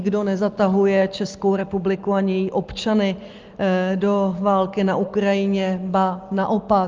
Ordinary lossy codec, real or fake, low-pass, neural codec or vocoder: Opus, 32 kbps; real; 7.2 kHz; none